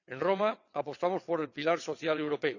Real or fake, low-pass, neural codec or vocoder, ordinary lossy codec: fake; 7.2 kHz; vocoder, 22.05 kHz, 80 mel bands, WaveNeXt; none